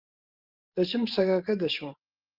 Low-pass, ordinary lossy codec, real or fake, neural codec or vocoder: 5.4 kHz; Opus, 24 kbps; fake; vocoder, 44.1 kHz, 128 mel bands every 512 samples, BigVGAN v2